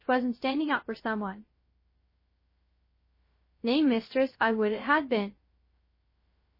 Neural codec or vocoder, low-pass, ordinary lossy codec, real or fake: codec, 16 kHz, 0.3 kbps, FocalCodec; 5.4 kHz; MP3, 24 kbps; fake